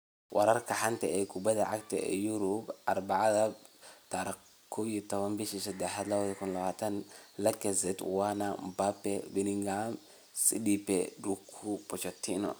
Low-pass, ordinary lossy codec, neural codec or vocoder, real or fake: none; none; none; real